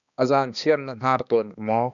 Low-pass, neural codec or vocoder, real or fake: 7.2 kHz; codec, 16 kHz, 2 kbps, X-Codec, HuBERT features, trained on balanced general audio; fake